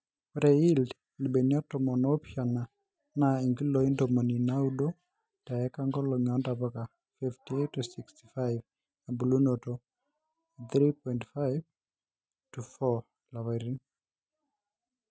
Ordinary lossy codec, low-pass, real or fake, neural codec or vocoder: none; none; real; none